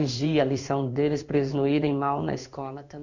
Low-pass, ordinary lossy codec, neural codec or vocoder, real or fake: 7.2 kHz; none; codec, 16 kHz in and 24 kHz out, 1 kbps, XY-Tokenizer; fake